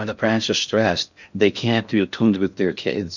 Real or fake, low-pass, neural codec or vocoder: fake; 7.2 kHz; codec, 16 kHz in and 24 kHz out, 0.6 kbps, FocalCodec, streaming, 4096 codes